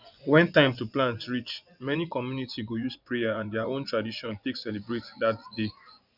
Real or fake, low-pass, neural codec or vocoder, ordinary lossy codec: fake; 5.4 kHz; vocoder, 24 kHz, 100 mel bands, Vocos; none